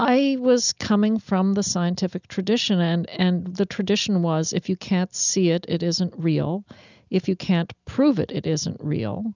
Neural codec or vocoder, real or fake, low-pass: none; real; 7.2 kHz